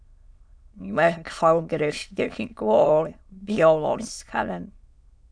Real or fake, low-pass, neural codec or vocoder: fake; 9.9 kHz; autoencoder, 22.05 kHz, a latent of 192 numbers a frame, VITS, trained on many speakers